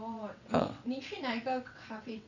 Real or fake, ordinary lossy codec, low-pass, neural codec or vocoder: fake; none; 7.2 kHz; vocoder, 22.05 kHz, 80 mel bands, WaveNeXt